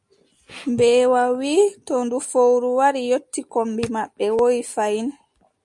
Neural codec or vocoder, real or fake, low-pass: none; real; 10.8 kHz